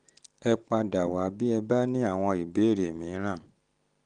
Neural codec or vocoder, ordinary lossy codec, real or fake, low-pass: none; Opus, 24 kbps; real; 9.9 kHz